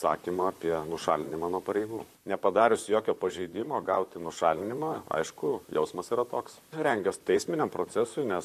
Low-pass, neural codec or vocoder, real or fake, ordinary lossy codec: 14.4 kHz; vocoder, 44.1 kHz, 128 mel bands, Pupu-Vocoder; fake; MP3, 64 kbps